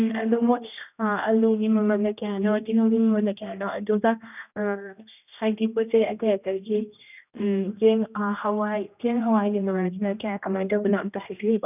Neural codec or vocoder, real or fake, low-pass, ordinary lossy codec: codec, 16 kHz, 1 kbps, X-Codec, HuBERT features, trained on general audio; fake; 3.6 kHz; none